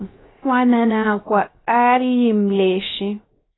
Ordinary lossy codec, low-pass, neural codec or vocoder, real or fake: AAC, 16 kbps; 7.2 kHz; codec, 16 kHz, 0.3 kbps, FocalCodec; fake